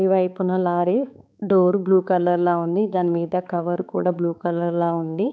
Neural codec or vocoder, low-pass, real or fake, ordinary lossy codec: codec, 16 kHz, 4 kbps, X-Codec, HuBERT features, trained on balanced general audio; none; fake; none